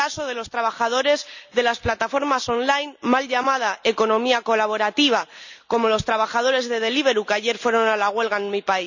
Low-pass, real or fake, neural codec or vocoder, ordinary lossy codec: 7.2 kHz; real; none; none